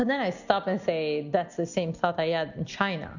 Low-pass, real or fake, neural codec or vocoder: 7.2 kHz; real; none